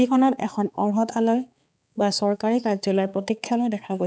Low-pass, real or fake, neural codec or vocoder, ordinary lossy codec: none; fake; codec, 16 kHz, 4 kbps, X-Codec, HuBERT features, trained on balanced general audio; none